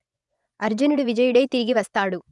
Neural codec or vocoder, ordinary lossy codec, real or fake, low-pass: vocoder, 24 kHz, 100 mel bands, Vocos; none; fake; 10.8 kHz